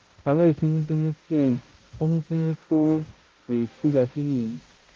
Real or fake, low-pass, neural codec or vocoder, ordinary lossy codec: fake; 7.2 kHz; codec, 16 kHz, 0.5 kbps, X-Codec, HuBERT features, trained on balanced general audio; Opus, 32 kbps